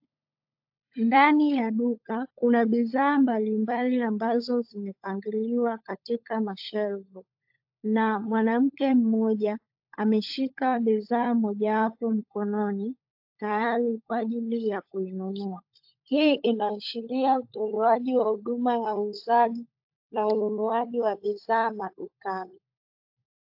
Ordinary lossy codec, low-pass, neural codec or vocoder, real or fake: AAC, 48 kbps; 5.4 kHz; codec, 16 kHz, 16 kbps, FunCodec, trained on LibriTTS, 50 frames a second; fake